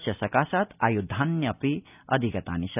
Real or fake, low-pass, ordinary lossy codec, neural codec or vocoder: real; 3.6 kHz; none; none